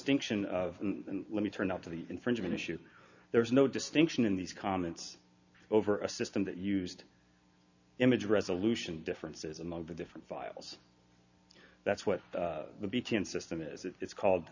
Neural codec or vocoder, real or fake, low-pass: none; real; 7.2 kHz